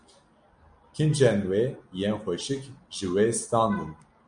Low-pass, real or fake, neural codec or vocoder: 9.9 kHz; real; none